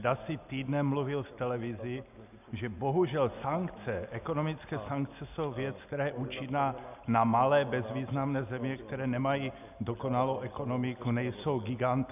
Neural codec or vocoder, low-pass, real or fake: none; 3.6 kHz; real